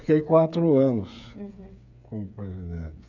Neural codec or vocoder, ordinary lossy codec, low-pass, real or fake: codec, 16 kHz, 8 kbps, FreqCodec, smaller model; none; 7.2 kHz; fake